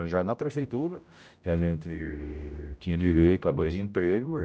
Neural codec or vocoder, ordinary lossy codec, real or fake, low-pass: codec, 16 kHz, 0.5 kbps, X-Codec, HuBERT features, trained on general audio; none; fake; none